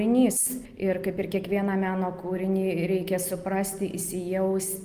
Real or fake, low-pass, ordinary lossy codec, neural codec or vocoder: real; 14.4 kHz; Opus, 32 kbps; none